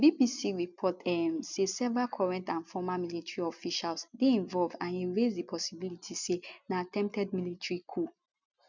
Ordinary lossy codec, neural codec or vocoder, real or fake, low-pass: none; none; real; 7.2 kHz